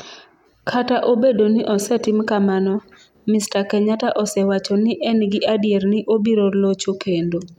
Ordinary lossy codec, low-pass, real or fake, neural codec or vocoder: none; 19.8 kHz; real; none